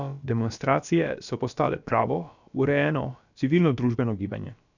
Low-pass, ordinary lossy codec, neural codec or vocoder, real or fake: 7.2 kHz; none; codec, 16 kHz, about 1 kbps, DyCAST, with the encoder's durations; fake